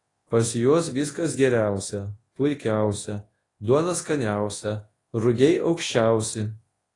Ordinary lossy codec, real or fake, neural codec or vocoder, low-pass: AAC, 32 kbps; fake; codec, 24 kHz, 0.9 kbps, WavTokenizer, large speech release; 10.8 kHz